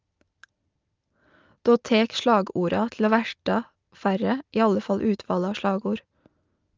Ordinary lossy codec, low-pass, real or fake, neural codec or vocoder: Opus, 24 kbps; 7.2 kHz; real; none